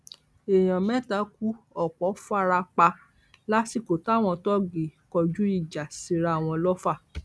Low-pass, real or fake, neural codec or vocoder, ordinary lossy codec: none; real; none; none